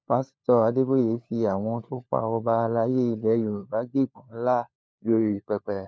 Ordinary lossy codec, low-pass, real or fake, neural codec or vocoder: none; none; fake; codec, 16 kHz, 4 kbps, FunCodec, trained on LibriTTS, 50 frames a second